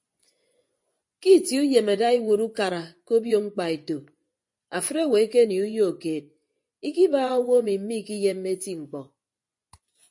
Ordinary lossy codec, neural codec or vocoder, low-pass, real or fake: MP3, 48 kbps; vocoder, 24 kHz, 100 mel bands, Vocos; 10.8 kHz; fake